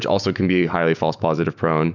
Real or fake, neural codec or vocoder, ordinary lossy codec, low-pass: fake; autoencoder, 48 kHz, 128 numbers a frame, DAC-VAE, trained on Japanese speech; Opus, 64 kbps; 7.2 kHz